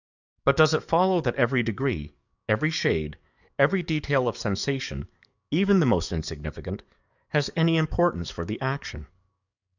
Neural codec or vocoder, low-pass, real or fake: codec, 44.1 kHz, 7.8 kbps, DAC; 7.2 kHz; fake